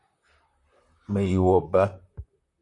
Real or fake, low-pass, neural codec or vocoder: fake; 10.8 kHz; vocoder, 44.1 kHz, 128 mel bands, Pupu-Vocoder